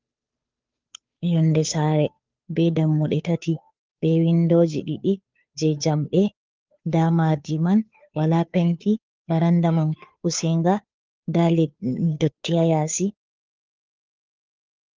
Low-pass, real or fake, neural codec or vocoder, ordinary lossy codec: 7.2 kHz; fake; codec, 16 kHz, 2 kbps, FunCodec, trained on Chinese and English, 25 frames a second; Opus, 32 kbps